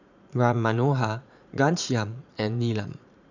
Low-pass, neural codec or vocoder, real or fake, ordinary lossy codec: 7.2 kHz; vocoder, 44.1 kHz, 80 mel bands, Vocos; fake; none